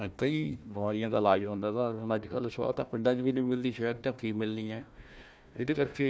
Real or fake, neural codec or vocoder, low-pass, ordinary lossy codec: fake; codec, 16 kHz, 1 kbps, FunCodec, trained on Chinese and English, 50 frames a second; none; none